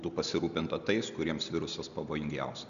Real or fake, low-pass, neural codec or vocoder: real; 7.2 kHz; none